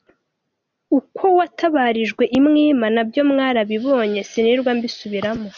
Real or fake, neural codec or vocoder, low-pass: real; none; 7.2 kHz